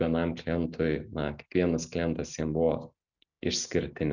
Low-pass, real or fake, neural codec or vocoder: 7.2 kHz; real; none